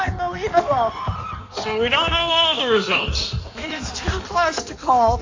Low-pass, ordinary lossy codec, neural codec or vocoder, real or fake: 7.2 kHz; AAC, 48 kbps; codec, 16 kHz in and 24 kHz out, 1.1 kbps, FireRedTTS-2 codec; fake